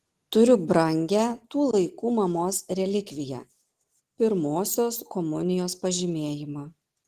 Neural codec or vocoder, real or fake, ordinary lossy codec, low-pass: none; real; Opus, 16 kbps; 14.4 kHz